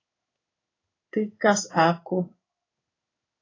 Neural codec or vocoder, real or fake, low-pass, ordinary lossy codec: codec, 16 kHz in and 24 kHz out, 1 kbps, XY-Tokenizer; fake; 7.2 kHz; AAC, 32 kbps